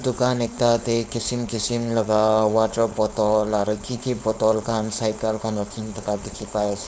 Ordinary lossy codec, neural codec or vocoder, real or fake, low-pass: none; codec, 16 kHz, 4.8 kbps, FACodec; fake; none